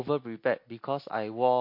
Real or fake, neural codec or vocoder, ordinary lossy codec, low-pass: real; none; MP3, 32 kbps; 5.4 kHz